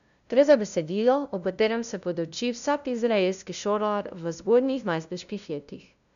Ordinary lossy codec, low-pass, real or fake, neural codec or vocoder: none; 7.2 kHz; fake; codec, 16 kHz, 0.5 kbps, FunCodec, trained on LibriTTS, 25 frames a second